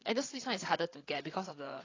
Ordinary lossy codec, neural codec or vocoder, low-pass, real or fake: AAC, 32 kbps; codec, 16 kHz, 8 kbps, FreqCodec, larger model; 7.2 kHz; fake